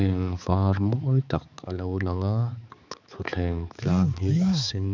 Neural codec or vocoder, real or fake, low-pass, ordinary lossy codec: codec, 16 kHz, 4 kbps, X-Codec, HuBERT features, trained on balanced general audio; fake; 7.2 kHz; none